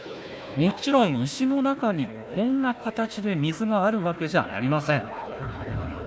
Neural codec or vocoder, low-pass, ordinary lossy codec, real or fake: codec, 16 kHz, 1 kbps, FunCodec, trained on Chinese and English, 50 frames a second; none; none; fake